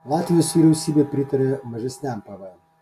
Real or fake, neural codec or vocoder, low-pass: real; none; 14.4 kHz